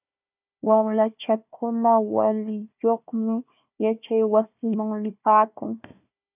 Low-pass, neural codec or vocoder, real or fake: 3.6 kHz; codec, 16 kHz, 1 kbps, FunCodec, trained on Chinese and English, 50 frames a second; fake